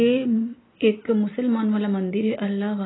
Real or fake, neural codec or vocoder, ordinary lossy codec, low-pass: real; none; AAC, 16 kbps; 7.2 kHz